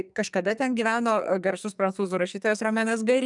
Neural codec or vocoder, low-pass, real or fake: codec, 44.1 kHz, 2.6 kbps, SNAC; 10.8 kHz; fake